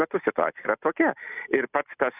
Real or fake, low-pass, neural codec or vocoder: real; 3.6 kHz; none